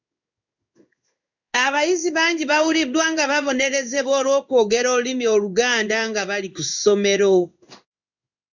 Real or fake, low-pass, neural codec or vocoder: fake; 7.2 kHz; codec, 16 kHz in and 24 kHz out, 1 kbps, XY-Tokenizer